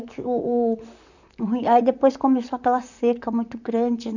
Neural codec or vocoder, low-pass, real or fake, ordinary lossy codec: vocoder, 44.1 kHz, 128 mel bands, Pupu-Vocoder; 7.2 kHz; fake; none